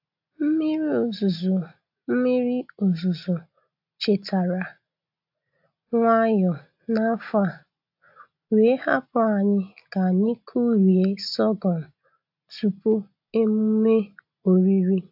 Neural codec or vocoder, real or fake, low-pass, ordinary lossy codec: none; real; 5.4 kHz; AAC, 48 kbps